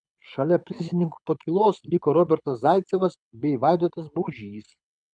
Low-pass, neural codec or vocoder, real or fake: 9.9 kHz; codec, 24 kHz, 6 kbps, HILCodec; fake